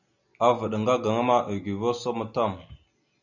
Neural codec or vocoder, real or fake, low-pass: none; real; 7.2 kHz